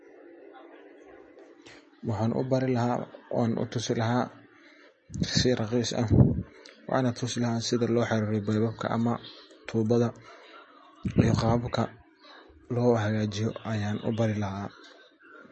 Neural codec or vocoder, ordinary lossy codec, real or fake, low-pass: none; MP3, 32 kbps; real; 10.8 kHz